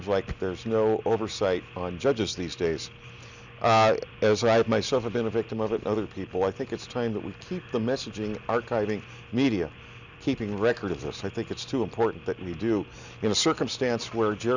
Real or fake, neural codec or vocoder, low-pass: real; none; 7.2 kHz